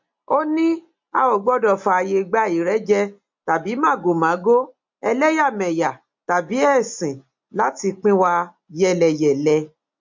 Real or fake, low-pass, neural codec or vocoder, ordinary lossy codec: real; 7.2 kHz; none; MP3, 48 kbps